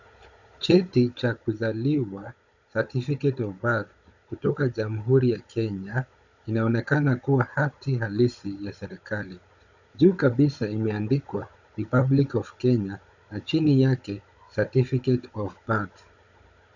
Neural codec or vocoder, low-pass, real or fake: codec, 16 kHz, 16 kbps, FunCodec, trained on Chinese and English, 50 frames a second; 7.2 kHz; fake